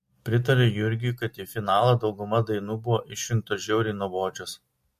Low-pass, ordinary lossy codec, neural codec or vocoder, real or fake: 14.4 kHz; MP3, 64 kbps; none; real